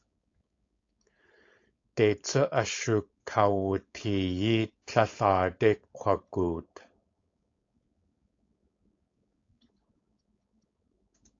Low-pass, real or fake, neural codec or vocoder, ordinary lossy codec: 7.2 kHz; fake; codec, 16 kHz, 4.8 kbps, FACodec; AAC, 48 kbps